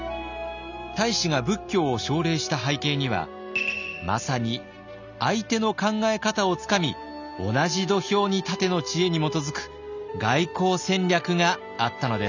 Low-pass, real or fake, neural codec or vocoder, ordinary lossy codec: 7.2 kHz; real; none; none